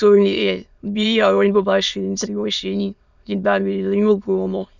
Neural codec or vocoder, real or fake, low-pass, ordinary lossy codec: autoencoder, 22.05 kHz, a latent of 192 numbers a frame, VITS, trained on many speakers; fake; 7.2 kHz; none